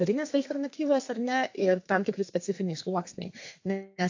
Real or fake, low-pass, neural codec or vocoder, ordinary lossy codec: fake; 7.2 kHz; codec, 32 kHz, 1.9 kbps, SNAC; AAC, 48 kbps